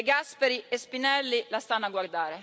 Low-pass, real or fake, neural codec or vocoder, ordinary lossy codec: none; real; none; none